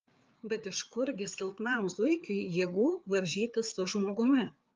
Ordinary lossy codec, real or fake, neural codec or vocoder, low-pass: Opus, 24 kbps; fake; codec, 16 kHz, 4 kbps, FreqCodec, larger model; 7.2 kHz